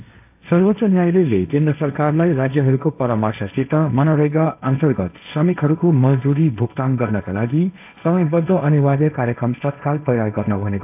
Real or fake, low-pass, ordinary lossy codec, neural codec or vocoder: fake; 3.6 kHz; AAC, 32 kbps; codec, 16 kHz, 1.1 kbps, Voila-Tokenizer